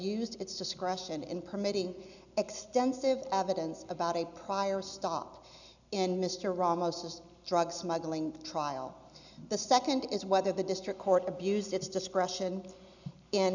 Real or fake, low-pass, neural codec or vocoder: real; 7.2 kHz; none